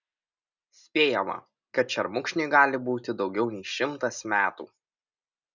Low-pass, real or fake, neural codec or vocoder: 7.2 kHz; real; none